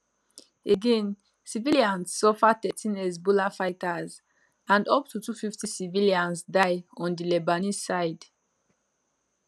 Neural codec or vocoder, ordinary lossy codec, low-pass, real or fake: none; none; none; real